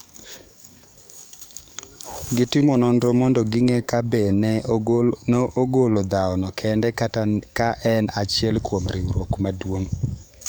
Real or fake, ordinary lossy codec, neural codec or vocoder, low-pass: fake; none; codec, 44.1 kHz, 7.8 kbps, DAC; none